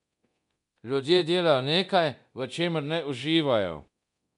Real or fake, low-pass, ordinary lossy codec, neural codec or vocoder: fake; 10.8 kHz; none; codec, 24 kHz, 0.9 kbps, DualCodec